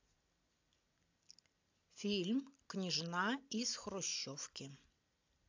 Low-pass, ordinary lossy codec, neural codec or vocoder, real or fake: 7.2 kHz; AAC, 48 kbps; none; real